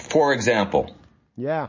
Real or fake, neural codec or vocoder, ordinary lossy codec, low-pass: real; none; MP3, 32 kbps; 7.2 kHz